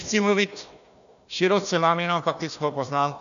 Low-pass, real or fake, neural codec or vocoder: 7.2 kHz; fake; codec, 16 kHz, 1 kbps, FunCodec, trained on Chinese and English, 50 frames a second